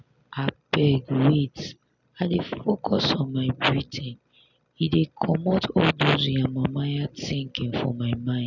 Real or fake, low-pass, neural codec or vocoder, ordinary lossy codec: real; 7.2 kHz; none; none